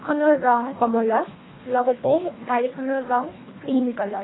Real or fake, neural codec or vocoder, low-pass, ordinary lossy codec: fake; codec, 24 kHz, 1.5 kbps, HILCodec; 7.2 kHz; AAC, 16 kbps